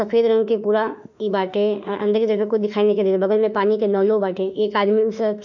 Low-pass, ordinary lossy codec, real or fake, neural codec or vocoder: 7.2 kHz; none; fake; autoencoder, 48 kHz, 32 numbers a frame, DAC-VAE, trained on Japanese speech